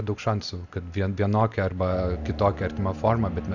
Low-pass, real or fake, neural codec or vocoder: 7.2 kHz; real; none